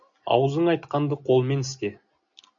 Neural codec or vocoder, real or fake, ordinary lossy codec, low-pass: none; real; AAC, 64 kbps; 7.2 kHz